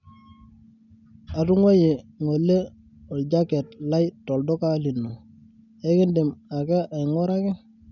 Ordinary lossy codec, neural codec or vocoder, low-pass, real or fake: none; none; 7.2 kHz; real